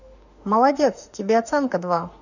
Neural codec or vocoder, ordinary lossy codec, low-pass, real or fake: autoencoder, 48 kHz, 32 numbers a frame, DAC-VAE, trained on Japanese speech; none; 7.2 kHz; fake